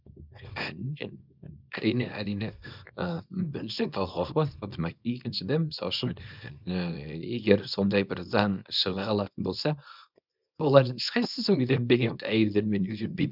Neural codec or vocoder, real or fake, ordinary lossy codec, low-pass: codec, 24 kHz, 0.9 kbps, WavTokenizer, small release; fake; none; 5.4 kHz